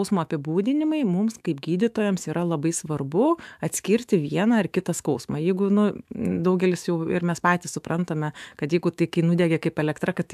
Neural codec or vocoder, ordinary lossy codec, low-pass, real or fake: autoencoder, 48 kHz, 128 numbers a frame, DAC-VAE, trained on Japanese speech; AAC, 96 kbps; 14.4 kHz; fake